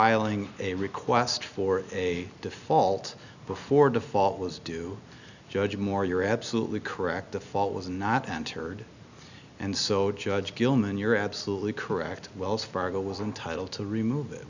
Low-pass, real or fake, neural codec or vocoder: 7.2 kHz; real; none